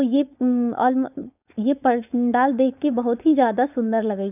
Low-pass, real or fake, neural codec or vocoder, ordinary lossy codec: 3.6 kHz; fake; codec, 16 kHz, 4.8 kbps, FACodec; none